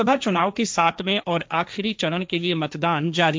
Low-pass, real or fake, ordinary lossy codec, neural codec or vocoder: none; fake; none; codec, 16 kHz, 1.1 kbps, Voila-Tokenizer